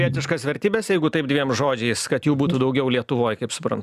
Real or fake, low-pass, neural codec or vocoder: real; 14.4 kHz; none